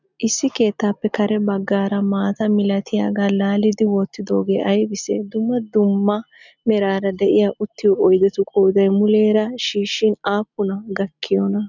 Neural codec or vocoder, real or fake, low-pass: none; real; 7.2 kHz